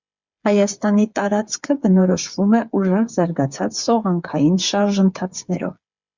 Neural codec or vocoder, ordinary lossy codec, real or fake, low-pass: codec, 16 kHz, 8 kbps, FreqCodec, smaller model; Opus, 64 kbps; fake; 7.2 kHz